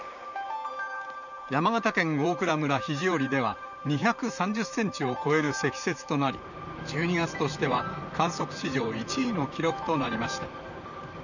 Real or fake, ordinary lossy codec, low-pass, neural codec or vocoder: fake; none; 7.2 kHz; vocoder, 44.1 kHz, 128 mel bands, Pupu-Vocoder